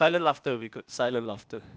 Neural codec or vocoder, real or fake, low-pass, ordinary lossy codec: codec, 16 kHz, 0.8 kbps, ZipCodec; fake; none; none